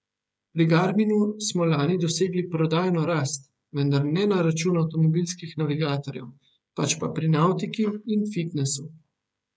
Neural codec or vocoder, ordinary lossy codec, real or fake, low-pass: codec, 16 kHz, 16 kbps, FreqCodec, smaller model; none; fake; none